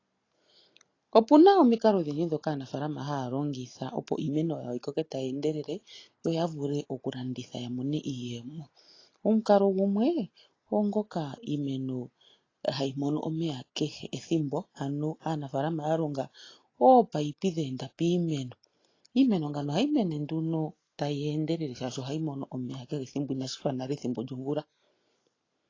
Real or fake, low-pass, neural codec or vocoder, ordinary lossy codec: real; 7.2 kHz; none; AAC, 32 kbps